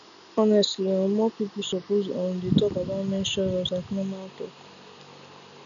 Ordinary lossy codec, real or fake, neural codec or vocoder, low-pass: none; real; none; 7.2 kHz